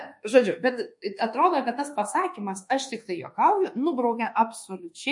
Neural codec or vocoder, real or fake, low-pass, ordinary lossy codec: codec, 24 kHz, 1.2 kbps, DualCodec; fake; 10.8 kHz; MP3, 48 kbps